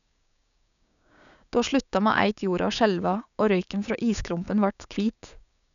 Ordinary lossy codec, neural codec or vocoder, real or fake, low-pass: none; codec, 16 kHz, 6 kbps, DAC; fake; 7.2 kHz